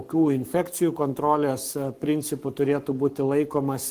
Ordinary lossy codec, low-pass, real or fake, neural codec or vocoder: Opus, 24 kbps; 14.4 kHz; fake; codec, 44.1 kHz, 7.8 kbps, Pupu-Codec